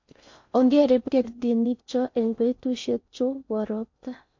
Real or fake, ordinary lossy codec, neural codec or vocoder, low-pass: fake; MP3, 48 kbps; codec, 16 kHz in and 24 kHz out, 0.6 kbps, FocalCodec, streaming, 4096 codes; 7.2 kHz